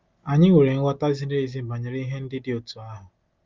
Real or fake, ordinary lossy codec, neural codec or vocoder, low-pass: real; Opus, 32 kbps; none; 7.2 kHz